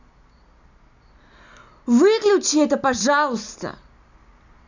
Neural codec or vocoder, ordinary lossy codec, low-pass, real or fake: none; none; 7.2 kHz; real